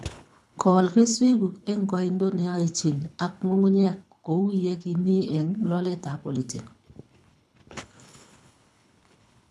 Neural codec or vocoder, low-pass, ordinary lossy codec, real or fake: codec, 24 kHz, 3 kbps, HILCodec; none; none; fake